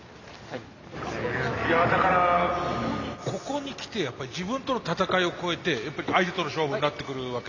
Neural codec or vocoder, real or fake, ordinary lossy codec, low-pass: none; real; none; 7.2 kHz